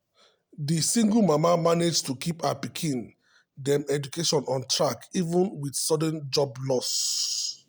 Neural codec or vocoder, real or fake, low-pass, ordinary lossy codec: none; real; none; none